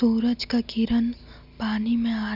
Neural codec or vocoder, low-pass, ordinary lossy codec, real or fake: none; 5.4 kHz; none; real